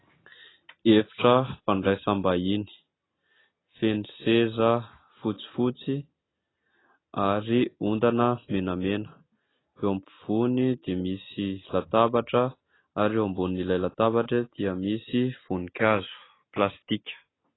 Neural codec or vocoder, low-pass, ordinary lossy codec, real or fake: none; 7.2 kHz; AAC, 16 kbps; real